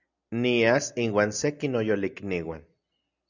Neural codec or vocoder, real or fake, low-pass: none; real; 7.2 kHz